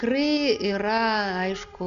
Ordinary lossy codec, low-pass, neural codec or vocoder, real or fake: Opus, 64 kbps; 7.2 kHz; none; real